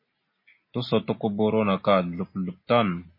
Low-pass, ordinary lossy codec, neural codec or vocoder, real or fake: 5.4 kHz; MP3, 24 kbps; none; real